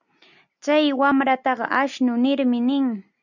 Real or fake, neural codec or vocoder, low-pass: real; none; 7.2 kHz